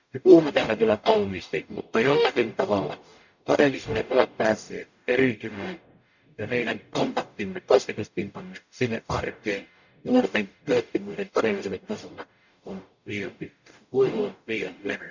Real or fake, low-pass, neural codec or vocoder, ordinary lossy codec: fake; 7.2 kHz; codec, 44.1 kHz, 0.9 kbps, DAC; none